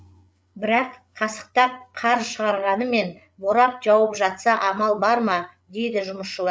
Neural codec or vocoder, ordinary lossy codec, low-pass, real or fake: codec, 16 kHz, 8 kbps, FreqCodec, larger model; none; none; fake